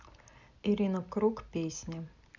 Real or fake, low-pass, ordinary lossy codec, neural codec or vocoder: real; 7.2 kHz; none; none